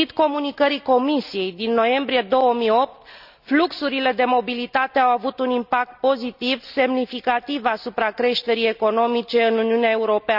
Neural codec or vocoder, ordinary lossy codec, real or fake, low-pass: none; none; real; 5.4 kHz